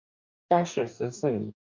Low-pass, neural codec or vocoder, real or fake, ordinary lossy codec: 7.2 kHz; codec, 44.1 kHz, 2.6 kbps, DAC; fake; MP3, 48 kbps